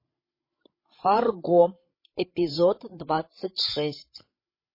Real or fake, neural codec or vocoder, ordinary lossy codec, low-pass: fake; codec, 16 kHz, 16 kbps, FreqCodec, larger model; MP3, 24 kbps; 5.4 kHz